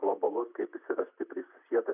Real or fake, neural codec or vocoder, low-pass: fake; vocoder, 44.1 kHz, 128 mel bands, Pupu-Vocoder; 3.6 kHz